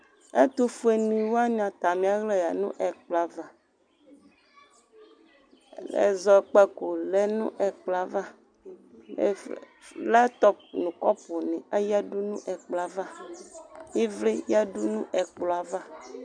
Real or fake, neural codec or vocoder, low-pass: real; none; 9.9 kHz